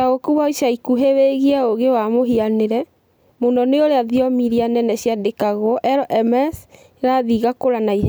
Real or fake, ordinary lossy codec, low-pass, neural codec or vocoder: real; none; none; none